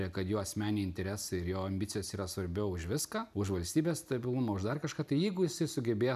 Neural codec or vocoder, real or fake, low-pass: none; real; 14.4 kHz